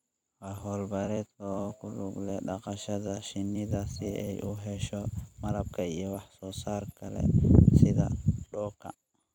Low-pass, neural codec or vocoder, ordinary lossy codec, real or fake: 19.8 kHz; vocoder, 44.1 kHz, 128 mel bands every 256 samples, BigVGAN v2; none; fake